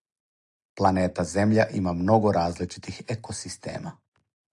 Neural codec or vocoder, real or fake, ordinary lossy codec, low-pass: none; real; AAC, 64 kbps; 10.8 kHz